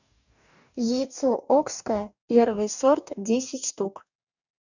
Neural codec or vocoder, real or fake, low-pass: codec, 44.1 kHz, 2.6 kbps, DAC; fake; 7.2 kHz